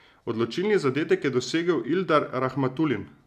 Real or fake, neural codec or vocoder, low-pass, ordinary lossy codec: real; none; 14.4 kHz; none